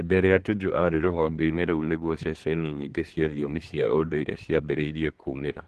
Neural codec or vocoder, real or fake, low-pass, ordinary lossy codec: codec, 24 kHz, 1 kbps, SNAC; fake; 10.8 kHz; Opus, 16 kbps